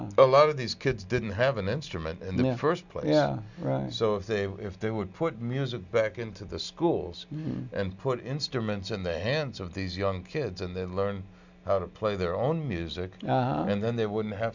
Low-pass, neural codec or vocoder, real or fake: 7.2 kHz; none; real